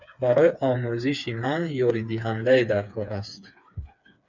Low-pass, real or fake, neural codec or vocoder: 7.2 kHz; fake; codec, 16 kHz, 4 kbps, FreqCodec, smaller model